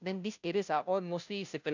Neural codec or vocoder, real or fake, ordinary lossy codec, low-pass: codec, 16 kHz, 0.5 kbps, FunCodec, trained on Chinese and English, 25 frames a second; fake; none; 7.2 kHz